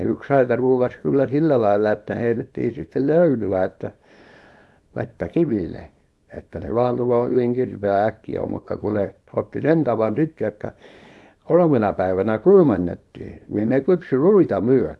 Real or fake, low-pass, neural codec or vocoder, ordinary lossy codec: fake; none; codec, 24 kHz, 0.9 kbps, WavTokenizer, small release; none